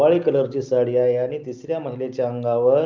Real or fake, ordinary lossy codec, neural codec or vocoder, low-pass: real; Opus, 24 kbps; none; 7.2 kHz